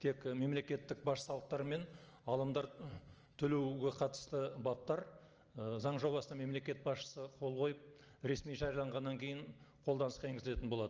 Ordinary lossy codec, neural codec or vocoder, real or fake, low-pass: Opus, 24 kbps; none; real; 7.2 kHz